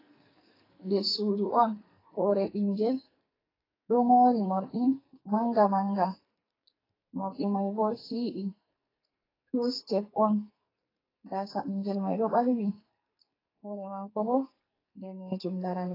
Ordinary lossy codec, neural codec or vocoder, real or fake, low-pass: AAC, 24 kbps; codec, 44.1 kHz, 2.6 kbps, SNAC; fake; 5.4 kHz